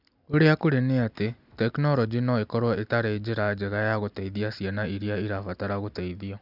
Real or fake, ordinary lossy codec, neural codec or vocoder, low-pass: real; none; none; 5.4 kHz